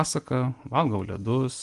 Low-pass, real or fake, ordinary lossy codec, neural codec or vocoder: 10.8 kHz; real; Opus, 24 kbps; none